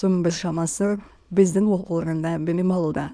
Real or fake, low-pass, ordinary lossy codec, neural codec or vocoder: fake; none; none; autoencoder, 22.05 kHz, a latent of 192 numbers a frame, VITS, trained on many speakers